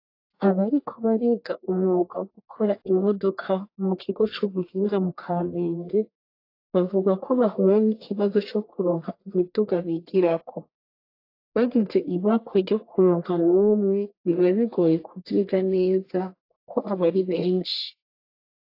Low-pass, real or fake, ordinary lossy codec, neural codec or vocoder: 5.4 kHz; fake; AAC, 32 kbps; codec, 44.1 kHz, 1.7 kbps, Pupu-Codec